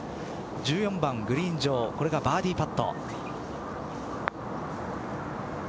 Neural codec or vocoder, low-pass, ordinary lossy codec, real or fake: none; none; none; real